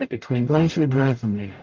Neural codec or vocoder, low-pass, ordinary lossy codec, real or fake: codec, 44.1 kHz, 0.9 kbps, DAC; 7.2 kHz; Opus, 32 kbps; fake